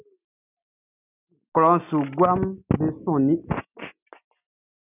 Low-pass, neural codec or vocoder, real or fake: 3.6 kHz; none; real